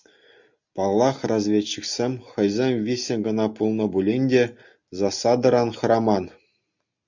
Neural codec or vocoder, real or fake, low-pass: none; real; 7.2 kHz